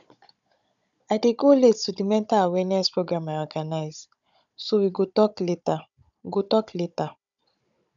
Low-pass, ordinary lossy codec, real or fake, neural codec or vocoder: 7.2 kHz; none; fake; codec, 16 kHz, 16 kbps, FunCodec, trained on Chinese and English, 50 frames a second